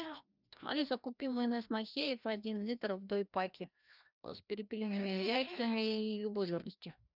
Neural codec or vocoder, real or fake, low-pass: codec, 16 kHz, 1 kbps, FreqCodec, larger model; fake; 5.4 kHz